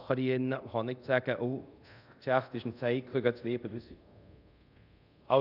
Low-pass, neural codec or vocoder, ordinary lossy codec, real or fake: 5.4 kHz; codec, 24 kHz, 0.5 kbps, DualCodec; none; fake